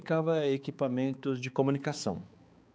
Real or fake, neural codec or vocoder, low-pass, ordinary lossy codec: fake; codec, 16 kHz, 4 kbps, X-Codec, HuBERT features, trained on balanced general audio; none; none